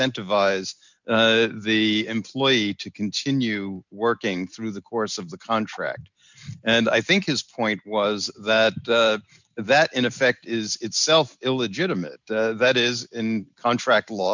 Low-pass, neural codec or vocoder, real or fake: 7.2 kHz; none; real